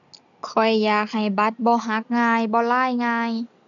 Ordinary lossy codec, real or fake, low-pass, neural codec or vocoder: none; real; 7.2 kHz; none